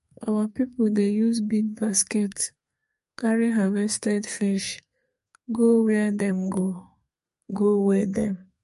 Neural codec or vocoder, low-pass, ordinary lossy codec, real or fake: codec, 32 kHz, 1.9 kbps, SNAC; 14.4 kHz; MP3, 48 kbps; fake